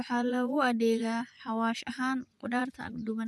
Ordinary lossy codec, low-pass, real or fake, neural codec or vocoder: none; none; fake; vocoder, 24 kHz, 100 mel bands, Vocos